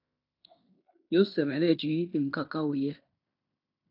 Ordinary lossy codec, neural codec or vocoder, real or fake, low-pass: AAC, 32 kbps; codec, 16 kHz in and 24 kHz out, 0.9 kbps, LongCat-Audio-Codec, fine tuned four codebook decoder; fake; 5.4 kHz